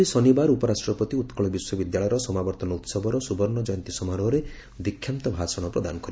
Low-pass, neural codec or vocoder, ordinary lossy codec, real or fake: none; none; none; real